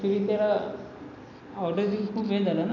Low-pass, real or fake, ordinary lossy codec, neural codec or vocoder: 7.2 kHz; real; none; none